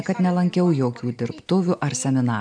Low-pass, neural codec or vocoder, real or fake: 9.9 kHz; none; real